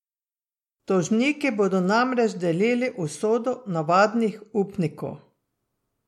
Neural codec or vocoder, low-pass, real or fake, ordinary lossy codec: none; 19.8 kHz; real; MP3, 64 kbps